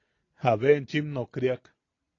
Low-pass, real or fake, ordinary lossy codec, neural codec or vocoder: 7.2 kHz; real; AAC, 32 kbps; none